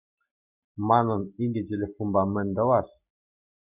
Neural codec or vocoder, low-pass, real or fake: none; 3.6 kHz; real